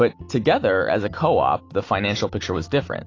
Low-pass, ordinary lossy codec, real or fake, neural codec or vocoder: 7.2 kHz; AAC, 32 kbps; real; none